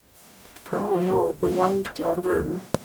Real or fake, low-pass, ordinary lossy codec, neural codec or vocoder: fake; none; none; codec, 44.1 kHz, 0.9 kbps, DAC